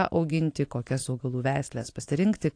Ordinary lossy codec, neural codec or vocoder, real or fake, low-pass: AAC, 48 kbps; vocoder, 44.1 kHz, 128 mel bands every 512 samples, BigVGAN v2; fake; 9.9 kHz